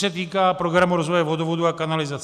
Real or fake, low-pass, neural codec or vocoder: real; 14.4 kHz; none